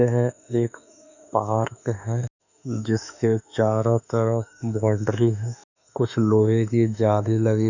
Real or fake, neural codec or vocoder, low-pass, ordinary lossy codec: fake; autoencoder, 48 kHz, 32 numbers a frame, DAC-VAE, trained on Japanese speech; 7.2 kHz; none